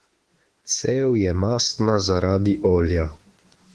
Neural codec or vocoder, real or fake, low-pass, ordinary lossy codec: autoencoder, 48 kHz, 32 numbers a frame, DAC-VAE, trained on Japanese speech; fake; 10.8 kHz; Opus, 16 kbps